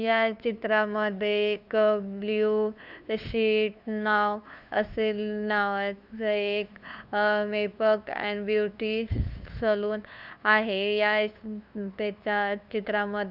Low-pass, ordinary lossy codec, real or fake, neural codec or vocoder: 5.4 kHz; none; fake; codec, 16 kHz, 2 kbps, FunCodec, trained on LibriTTS, 25 frames a second